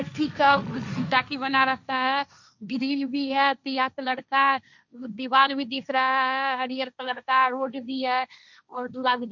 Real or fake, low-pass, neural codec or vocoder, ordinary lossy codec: fake; 7.2 kHz; codec, 16 kHz, 1.1 kbps, Voila-Tokenizer; none